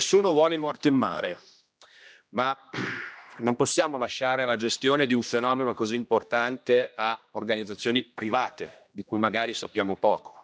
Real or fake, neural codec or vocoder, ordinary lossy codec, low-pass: fake; codec, 16 kHz, 1 kbps, X-Codec, HuBERT features, trained on general audio; none; none